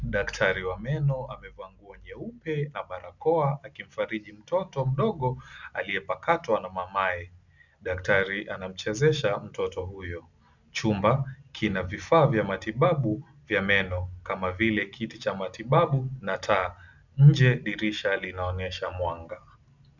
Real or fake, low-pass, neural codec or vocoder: real; 7.2 kHz; none